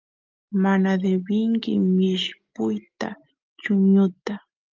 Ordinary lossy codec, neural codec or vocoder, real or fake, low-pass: Opus, 32 kbps; none; real; 7.2 kHz